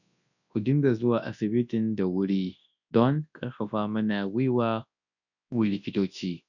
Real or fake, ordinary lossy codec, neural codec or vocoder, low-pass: fake; none; codec, 24 kHz, 0.9 kbps, WavTokenizer, large speech release; 7.2 kHz